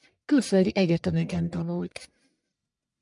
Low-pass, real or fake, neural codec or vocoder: 10.8 kHz; fake; codec, 44.1 kHz, 1.7 kbps, Pupu-Codec